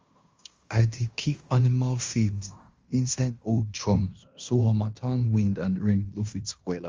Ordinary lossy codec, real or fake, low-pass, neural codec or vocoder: Opus, 32 kbps; fake; 7.2 kHz; codec, 16 kHz in and 24 kHz out, 0.9 kbps, LongCat-Audio-Codec, fine tuned four codebook decoder